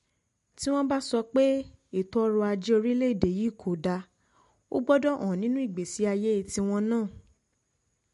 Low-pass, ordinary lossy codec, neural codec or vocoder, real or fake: 14.4 kHz; MP3, 48 kbps; none; real